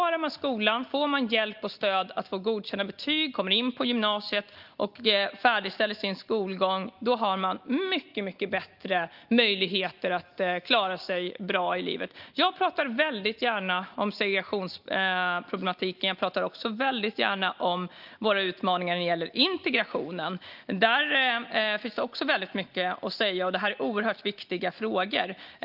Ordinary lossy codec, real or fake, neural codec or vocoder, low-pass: Opus, 32 kbps; real; none; 5.4 kHz